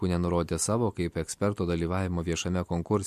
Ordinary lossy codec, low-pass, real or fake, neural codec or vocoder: MP3, 64 kbps; 14.4 kHz; real; none